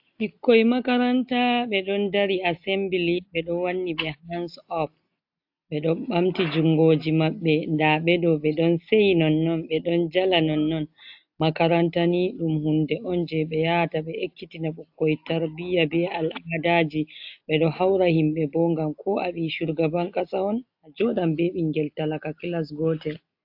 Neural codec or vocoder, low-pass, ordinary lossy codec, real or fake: none; 5.4 kHz; AAC, 48 kbps; real